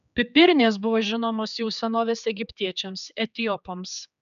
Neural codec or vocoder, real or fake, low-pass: codec, 16 kHz, 4 kbps, X-Codec, HuBERT features, trained on general audio; fake; 7.2 kHz